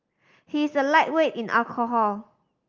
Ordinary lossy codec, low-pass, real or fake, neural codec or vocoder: Opus, 32 kbps; 7.2 kHz; real; none